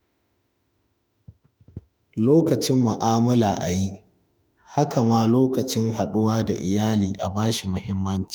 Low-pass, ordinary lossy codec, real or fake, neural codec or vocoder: none; none; fake; autoencoder, 48 kHz, 32 numbers a frame, DAC-VAE, trained on Japanese speech